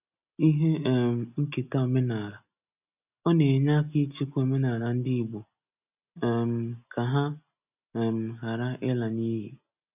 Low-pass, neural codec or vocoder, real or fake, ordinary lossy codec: 3.6 kHz; none; real; none